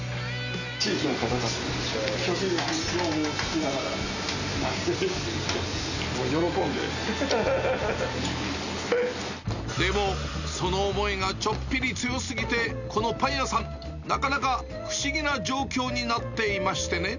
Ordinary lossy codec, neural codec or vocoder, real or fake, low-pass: none; none; real; 7.2 kHz